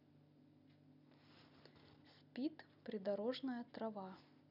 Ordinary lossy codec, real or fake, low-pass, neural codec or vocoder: none; real; 5.4 kHz; none